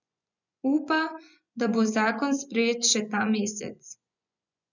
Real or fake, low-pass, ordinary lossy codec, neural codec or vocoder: fake; 7.2 kHz; none; vocoder, 24 kHz, 100 mel bands, Vocos